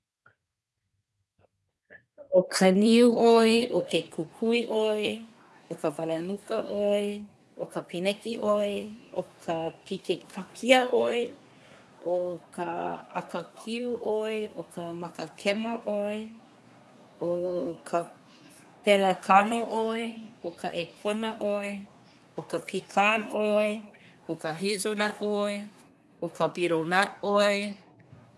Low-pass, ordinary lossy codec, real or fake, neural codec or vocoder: none; none; fake; codec, 24 kHz, 1 kbps, SNAC